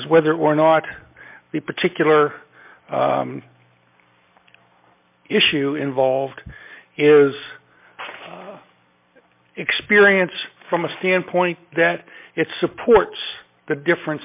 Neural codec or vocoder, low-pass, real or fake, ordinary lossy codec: none; 3.6 kHz; real; MP3, 24 kbps